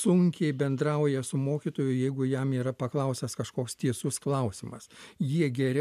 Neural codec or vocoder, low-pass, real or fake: none; 14.4 kHz; real